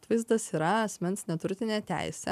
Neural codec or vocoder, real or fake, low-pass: none; real; 14.4 kHz